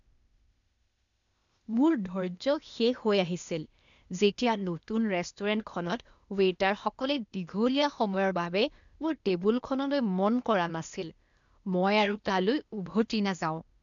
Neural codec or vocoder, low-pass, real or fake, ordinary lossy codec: codec, 16 kHz, 0.8 kbps, ZipCodec; 7.2 kHz; fake; none